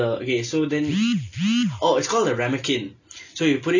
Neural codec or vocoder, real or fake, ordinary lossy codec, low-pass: none; real; none; 7.2 kHz